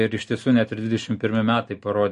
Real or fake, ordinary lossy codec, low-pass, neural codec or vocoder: real; MP3, 48 kbps; 14.4 kHz; none